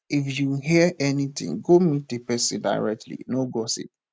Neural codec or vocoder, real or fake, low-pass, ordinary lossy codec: none; real; none; none